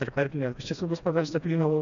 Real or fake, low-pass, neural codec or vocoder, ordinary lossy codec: fake; 7.2 kHz; codec, 16 kHz, 1 kbps, FreqCodec, smaller model; MP3, 96 kbps